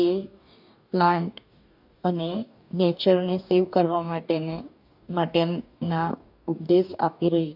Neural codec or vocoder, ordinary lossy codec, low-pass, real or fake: codec, 44.1 kHz, 2.6 kbps, DAC; none; 5.4 kHz; fake